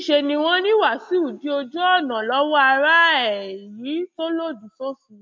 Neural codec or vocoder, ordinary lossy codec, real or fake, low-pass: none; none; real; none